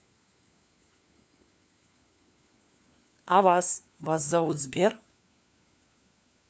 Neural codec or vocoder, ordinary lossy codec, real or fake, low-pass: codec, 16 kHz, 4 kbps, FunCodec, trained on LibriTTS, 50 frames a second; none; fake; none